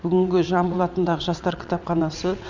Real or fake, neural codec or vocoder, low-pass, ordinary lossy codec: fake; vocoder, 22.05 kHz, 80 mel bands, WaveNeXt; 7.2 kHz; Opus, 64 kbps